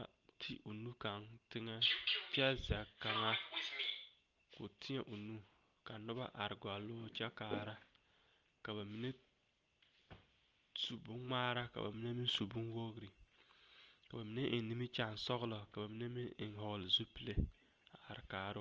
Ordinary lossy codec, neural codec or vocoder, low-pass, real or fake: Opus, 24 kbps; none; 7.2 kHz; real